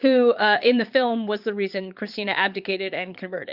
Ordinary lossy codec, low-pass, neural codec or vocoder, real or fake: Opus, 64 kbps; 5.4 kHz; vocoder, 22.05 kHz, 80 mel bands, Vocos; fake